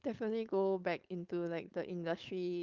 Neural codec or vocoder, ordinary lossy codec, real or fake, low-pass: codec, 16 kHz, 4.8 kbps, FACodec; Opus, 32 kbps; fake; 7.2 kHz